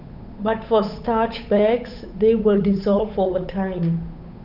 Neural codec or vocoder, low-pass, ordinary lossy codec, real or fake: codec, 16 kHz, 8 kbps, FunCodec, trained on Chinese and English, 25 frames a second; 5.4 kHz; none; fake